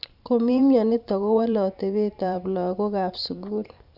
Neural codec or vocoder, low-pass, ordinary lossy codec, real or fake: vocoder, 44.1 kHz, 128 mel bands every 512 samples, BigVGAN v2; 5.4 kHz; none; fake